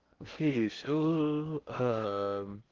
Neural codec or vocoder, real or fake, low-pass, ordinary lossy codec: codec, 16 kHz in and 24 kHz out, 0.6 kbps, FocalCodec, streaming, 2048 codes; fake; 7.2 kHz; Opus, 16 kbps